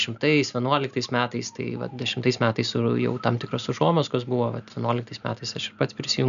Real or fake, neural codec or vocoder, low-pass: real; none; 7.2 kHz